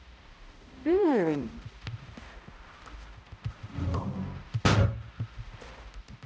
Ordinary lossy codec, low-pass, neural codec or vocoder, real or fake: none; none; codec, 16 kHz, 0.5 kbps, X-Codec, HuBERT features, trained on balanced general audio; fake